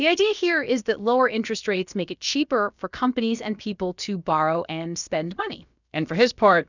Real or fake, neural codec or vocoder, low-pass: fake; codec, 16 kHz, about 1 kbps, DyCAST, with the encoder's durations; 7.2 kHz